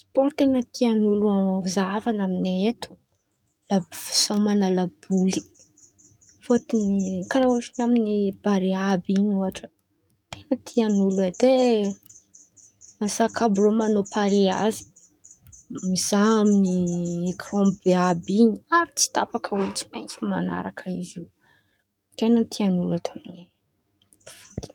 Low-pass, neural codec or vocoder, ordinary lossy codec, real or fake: 19.8 kHz; codec, 44.1 kHz, 7.8 kbps, DAC; none; fake